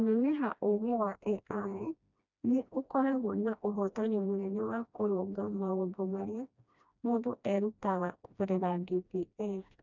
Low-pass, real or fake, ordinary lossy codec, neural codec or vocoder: 7.2 kHz; fake; none; codec, 16 kHz, 1 kbps, FreqCodec, smaller model